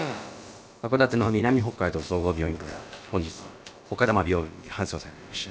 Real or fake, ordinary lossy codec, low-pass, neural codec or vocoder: fake; none; none; codec, 16 kHz, about 1 kbps, DyCAST, with the encoder's durations